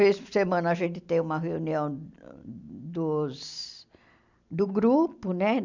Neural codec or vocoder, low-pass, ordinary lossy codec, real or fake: none; 7.2 kHz; MP3, 64 kbps; real